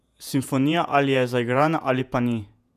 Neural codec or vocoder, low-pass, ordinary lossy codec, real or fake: vocoder, 48 kHz, 128 mel bands, Vocos; 14.4 kHz; none; fake